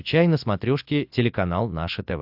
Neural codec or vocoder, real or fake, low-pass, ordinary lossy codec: none; real; 5.4 kHz; AAC, 48 kbps